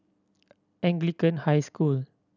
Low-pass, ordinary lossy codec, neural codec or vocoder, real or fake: 7.2 kHz; none; none; real